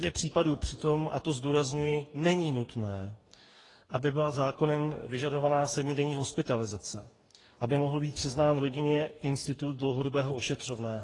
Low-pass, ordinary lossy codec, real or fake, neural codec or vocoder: 10.8 kHz; AAC, 32 kbps; fake; codec, 44.1 kHz, 2.6 kbps, DAC